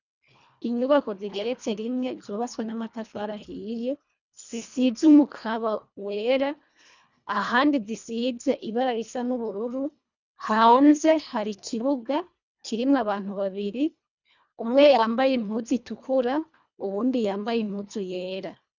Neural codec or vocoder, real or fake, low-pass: codec, 24 kHz, 1.5 kbps, HILCodec; fake; 7.2 kHz